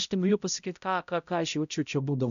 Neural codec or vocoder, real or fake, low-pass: codec, 16 kHz, 0.5 kbps, X-Codec, HuBERT features, trained on balanced general audio; fake; 7.2 kHz